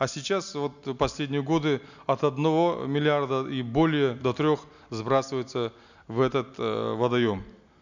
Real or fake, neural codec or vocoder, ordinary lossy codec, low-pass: real; none; none; 7.2 kHz